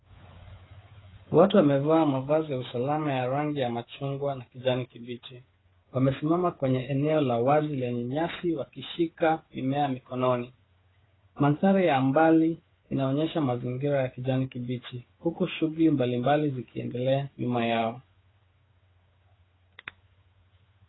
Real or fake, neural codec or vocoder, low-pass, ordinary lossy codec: fake; codec, 16 kHz, 8 kbps, FreqCodec, smaller model; 7.2 kHz; AAC, 16 kbps